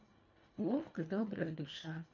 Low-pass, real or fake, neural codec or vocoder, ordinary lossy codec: 7.2 kHz; fake; codec, 24 kHz, 1.5 kbps, HILCodec; none